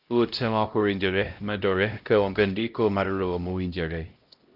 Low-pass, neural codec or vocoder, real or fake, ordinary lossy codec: 5.4 kHz; codec, 16 kHz, 0.5 kbps, X-Codec, HuBERT features, trained on LibriSpeech; fake; Opus, 24 kbps